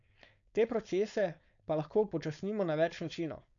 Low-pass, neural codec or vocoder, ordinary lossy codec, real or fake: 7.2 kHz; codec, 16 kHz, 4.8 kbps, FACodec; none; fake